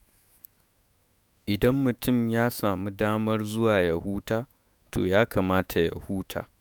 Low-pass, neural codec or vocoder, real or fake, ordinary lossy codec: none; autoencoder, 48 kHz, 128 numbers a frame, DAC-VAE, trained on Japanese speech; fake; none